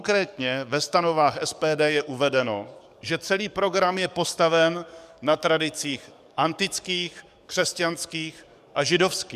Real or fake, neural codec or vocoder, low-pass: fake; codec, 44.1 kHz, 7.8 kbps, DAC; 14.4 kHz